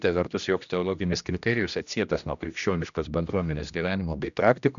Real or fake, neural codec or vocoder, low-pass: fake; codec, 16 kHz, 1 kbps, X-Codec, HuBERT features, trained on general audio; 7.2 kHz